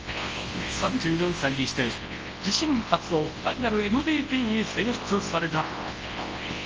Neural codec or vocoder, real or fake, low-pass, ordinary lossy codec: codec, 24 kHz, 0.9 kbps, WavTokenizer, large speech release; fake; 7.2 kHz; Opus, 24 kbps